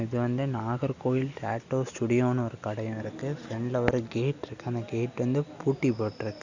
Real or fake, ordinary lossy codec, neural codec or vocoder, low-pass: real; Opus, 64 kbps; none; 7.2 kHz